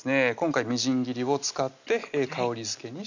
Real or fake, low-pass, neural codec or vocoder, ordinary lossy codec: real; 7.2 kHz; none; none